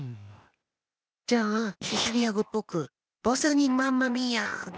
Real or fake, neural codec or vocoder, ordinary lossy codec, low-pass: fake; codec, 16 kHz, 0.8 kbps, ZipCodec; none; none